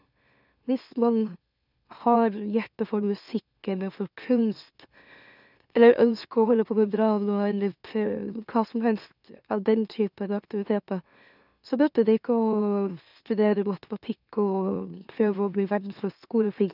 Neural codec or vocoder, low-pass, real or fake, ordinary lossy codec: autoencoder, 44.1 kHz, a latent of 192 numbers a frame, MeloTTS; 5.4 kHz; fake; none